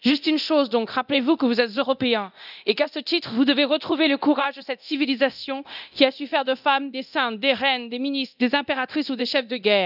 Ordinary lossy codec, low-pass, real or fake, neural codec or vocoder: none; 5.4 kHz; fake; codec, 24 kHz, 0.9 kbps, DualCodec